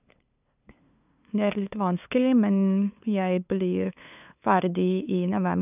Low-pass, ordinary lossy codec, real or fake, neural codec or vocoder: 3.6 kHz; none; fake; codec, 16 kHz, 2 kbps, FunCodec, trained on LibriTTS, 25 frames a second